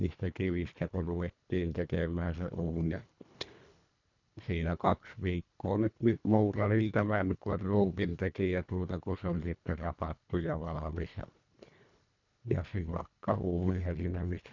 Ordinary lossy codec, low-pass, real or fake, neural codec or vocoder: none; 7.2 kHz; fake; codec, 24 kHz, 1.5 kbps, HILCodec